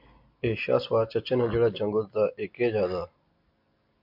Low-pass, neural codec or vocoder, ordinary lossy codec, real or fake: 5.4 kHz; none; MP3, 48 kbps; real